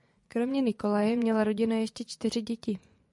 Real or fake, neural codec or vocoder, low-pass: fake; vocoder, 24 kHz, 100 mel bands, Vocos; 10.8 kHz